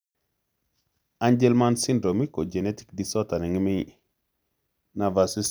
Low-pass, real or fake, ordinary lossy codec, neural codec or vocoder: none; real; none; none